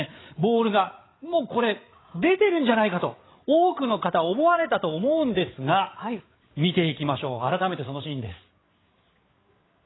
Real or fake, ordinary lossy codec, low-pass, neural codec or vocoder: fake; AAC, 16 kbps; 7.2 kHz; codec, 16 kHz, 8 kbps, FreqCodec, larger model